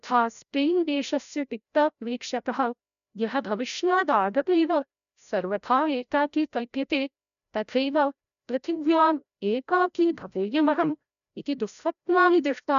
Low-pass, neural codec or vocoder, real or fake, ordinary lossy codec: 7.2 kHz; codec, 16 kHz, 0.5 kbps, FreqCodec, larger model; fake; none